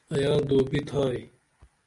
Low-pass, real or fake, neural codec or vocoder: 10.8 kHz; real; none